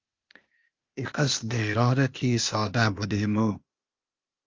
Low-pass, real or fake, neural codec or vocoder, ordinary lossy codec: 7.2 kHz; fake; codec, 16 kHz, 0.8 kbps, ZipCodec; Opus, 32 kbps